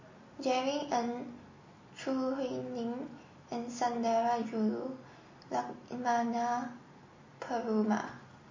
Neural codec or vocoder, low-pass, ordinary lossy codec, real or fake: none; 7.2 kHz; MP3, 32 kbps; real